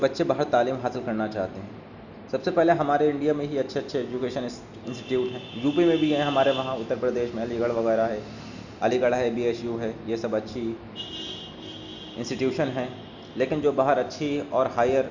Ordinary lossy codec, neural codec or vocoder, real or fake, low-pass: none; none; real; 7.2 kHz